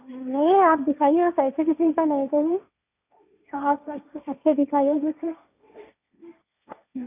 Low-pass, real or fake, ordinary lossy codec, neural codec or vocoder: 3.6 kHz; fake; none; codec, 16 kHz, 1.1 kbps, Voila-Tokenizer